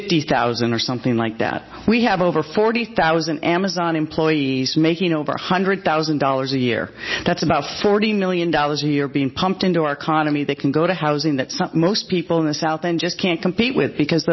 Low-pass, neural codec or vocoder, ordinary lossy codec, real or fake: 7.2 kHz; none; MP3, 24 kbps; real